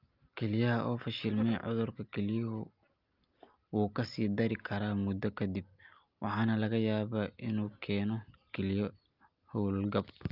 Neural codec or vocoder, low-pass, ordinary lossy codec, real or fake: none; 5.4 kHz; Opus, 24 kbps; real